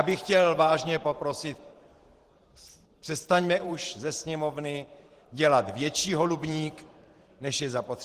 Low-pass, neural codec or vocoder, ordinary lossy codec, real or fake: 14.4 kHz; vocoder, 44.1 kHz, 128 mel bands every 512 samples, BigVGAN v2; Opus, 16 kbps; fake